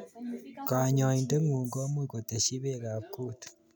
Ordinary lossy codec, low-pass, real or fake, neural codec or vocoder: none; none; real; none